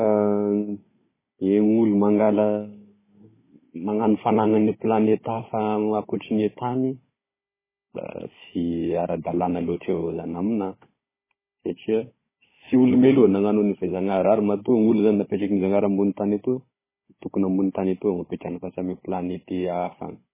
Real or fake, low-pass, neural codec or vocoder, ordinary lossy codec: fake; 3.6 kHz; codec, 16 kHz, 16 kbps, FunCodec, trained on Chinese and English, 50 frames a second; MP3, 16 kbps